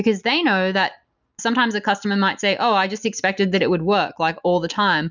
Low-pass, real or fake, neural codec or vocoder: 7.2 kHz; real; none